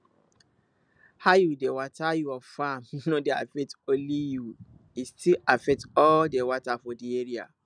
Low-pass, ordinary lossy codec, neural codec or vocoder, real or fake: 9.9 kHz; none; none; real